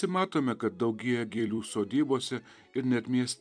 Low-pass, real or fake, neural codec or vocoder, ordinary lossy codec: 9.9 kHz; fake; vocoder, 44.1 kHz, 128 mel bands every 256 samples, BigVGAN v2; MP3, 96 kbps